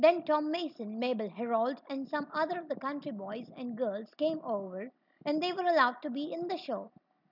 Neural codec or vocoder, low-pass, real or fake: none; 5.4 kHz; real